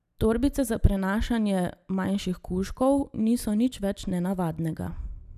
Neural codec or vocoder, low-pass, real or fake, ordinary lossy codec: none; 14.4 kHz; real; none